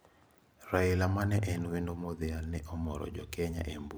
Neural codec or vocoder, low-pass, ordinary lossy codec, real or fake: vocoder, 44.1 kHz, 128 mel bands every 512 samples, BigVGAN v2; none; none; fake